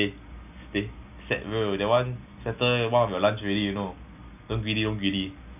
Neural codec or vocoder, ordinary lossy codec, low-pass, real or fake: none; none; 3.6 kHz; real